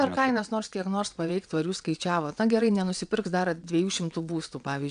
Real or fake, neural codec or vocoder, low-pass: fake; vocoder, 22.05 kHz, 80 mel bands, WaveNeXt; 9.9 kHz